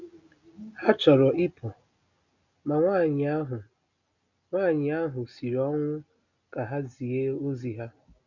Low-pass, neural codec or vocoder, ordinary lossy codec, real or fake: 7.2 kHz; none; none; real